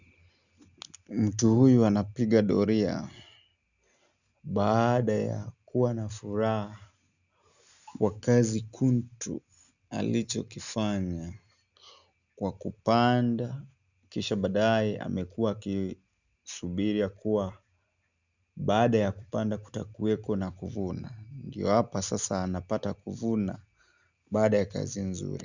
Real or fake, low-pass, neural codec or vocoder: real; 7.2 kHz; none